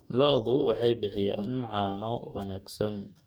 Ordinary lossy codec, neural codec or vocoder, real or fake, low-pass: none; codec, 44.1 kHz, 2.6 kbps, DAC; fake; none